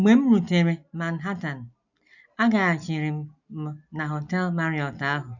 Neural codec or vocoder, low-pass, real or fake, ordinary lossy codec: none; 7.2 kHz; real; none